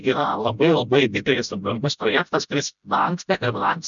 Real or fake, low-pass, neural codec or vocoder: fake; 7.2 kHz; codec, 16 kHz, 0.5 kbps, FreqCodec, smaller model